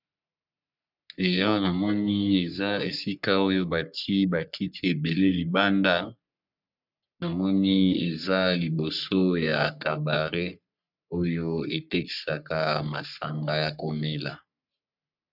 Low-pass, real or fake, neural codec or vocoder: 5.4 kHz; fake; codec, 44.1 kHz, 3.4 kbps, Pupu-Codec